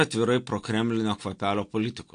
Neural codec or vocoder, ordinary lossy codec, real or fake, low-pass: none; AAC, 48 kbps; real; 9.9 kHz